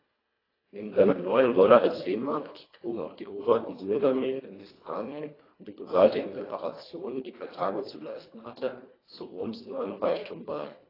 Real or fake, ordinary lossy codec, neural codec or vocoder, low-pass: fake; AAC, 24 kbps; codec, 24 kHz, 1.5 kbps, HILCodec; 5.4 kHz